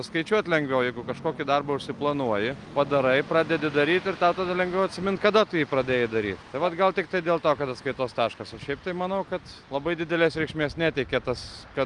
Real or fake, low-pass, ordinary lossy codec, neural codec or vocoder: real; 10.8 kHz; Opus, 32 kbps; none